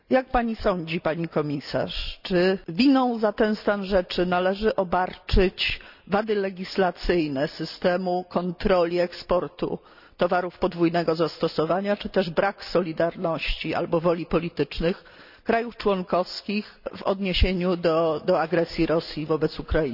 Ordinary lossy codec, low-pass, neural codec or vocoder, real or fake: none; 5.4 kHz; none; real